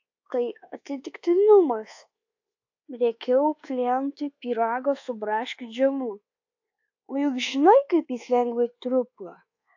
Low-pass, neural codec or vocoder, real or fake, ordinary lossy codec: 7.2 kHz; codec, 24 kHz, 1.2 kbps, DualCodec; fake; AAC, 48 kbps